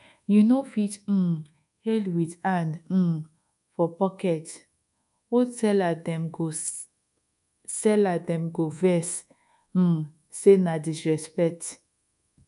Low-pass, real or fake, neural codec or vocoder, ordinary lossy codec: 10.8 kHz; fake; codec, 24 kHz, 1.2 kbps, DualCodec; none